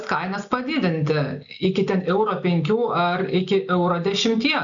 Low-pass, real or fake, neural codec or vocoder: 7.2 kHz; real; none